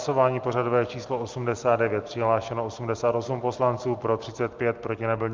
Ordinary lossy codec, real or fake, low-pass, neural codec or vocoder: Opus, 24 kbps; real; 7.2 kHz; none